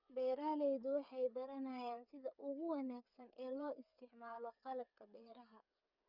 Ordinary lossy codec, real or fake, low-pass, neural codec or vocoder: none; fake; 5.4 kHz; codec, 16 kHz, 8 kbps, FreqCodec, smaller model